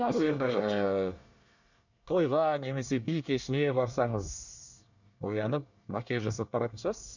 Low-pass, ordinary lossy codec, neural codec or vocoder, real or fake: 7.2 kHz; none; codec, 24 kHz, 1 kbps, SNAC; fake